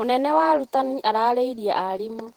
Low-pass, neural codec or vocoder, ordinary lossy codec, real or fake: 19.8 kHz; vocoder, 48 kHz, 128 mel bands, Vocos; Opus, 16 kbps; fake